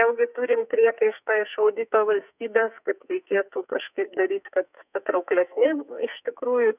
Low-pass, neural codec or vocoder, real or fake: 3.6 kHz; codec, 44.1 kHz, 2.6 kbps, SNAC; fake